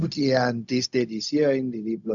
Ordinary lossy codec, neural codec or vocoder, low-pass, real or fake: none; codec, 16 kHz, 0.4 kbps, LongCat-Audio-Codec; 7.2 kHz; fake